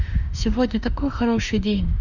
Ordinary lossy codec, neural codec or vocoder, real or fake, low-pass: none; autoencoder, 48 kHz, 32 numbers a frame, DAC-VAE, trained on Japanese speech; fake; 7.2 kHz